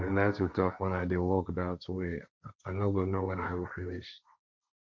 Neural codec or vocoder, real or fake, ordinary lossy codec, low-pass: codec, 16 kHz, 1.1 kbps, Voila-Tokenizer; fake; none; none